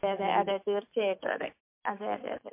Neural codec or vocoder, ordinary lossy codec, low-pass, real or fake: autoencoder, 48 kHz, 128 numbers a frame, DAC-VAE, trained on Japanese speech; MP3, 24 kbps; 3.6 kHz; fake